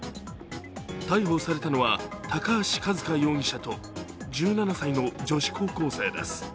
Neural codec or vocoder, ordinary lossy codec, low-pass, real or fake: none; none; none; real